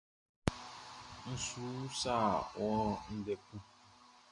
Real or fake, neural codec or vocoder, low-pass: real; none; 10.8 kHz